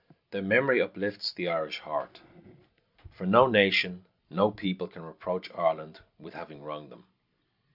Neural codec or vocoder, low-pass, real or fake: none; 5.4 kHz; real